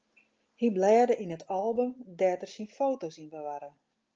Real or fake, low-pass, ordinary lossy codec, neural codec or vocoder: real; 7.2 kHz; Opus, 24 kbps; none